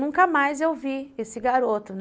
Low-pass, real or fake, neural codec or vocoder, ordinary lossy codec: none; real; none; none